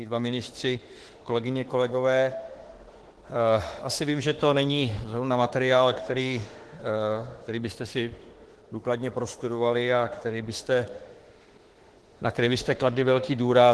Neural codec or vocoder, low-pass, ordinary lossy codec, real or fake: autoencoder, 48 kHz, 32 numbers a frame, DAC-VAE, trained on Japanese speech; 10.8 kHz; Opus, 16 kbps; fake